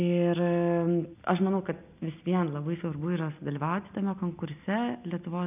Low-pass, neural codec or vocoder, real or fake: 3.6 kHz; none; real